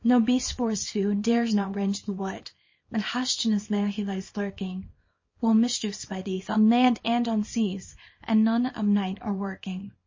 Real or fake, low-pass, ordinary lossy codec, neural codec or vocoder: fake; 7.2 kHz; MP3, 32 kbps; codec, 24 kHz, 0.9 kbps, WavTokenizer, small release